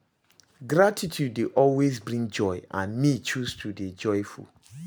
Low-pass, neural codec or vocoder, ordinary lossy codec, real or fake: none; none; none; real